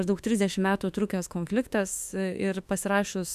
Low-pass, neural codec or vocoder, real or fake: 14.4 kHz; autoencoder, 48 kHz, 32 numbers a frame, DAC-VAE, trained on Japanese speech; fake